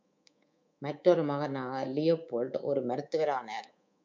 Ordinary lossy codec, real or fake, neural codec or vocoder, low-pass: MP3, 64 kbps; fake; codec, 24 kHz, 3.1 kbps, DualCodec; 7.2 kHz